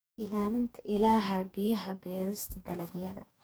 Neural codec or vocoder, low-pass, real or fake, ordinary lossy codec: codec, 44.1 kHz, 2.6 kbps, DAC; none; fake; none